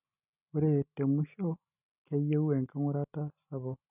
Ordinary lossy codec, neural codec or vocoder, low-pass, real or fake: none; none; 3.6 kHz; real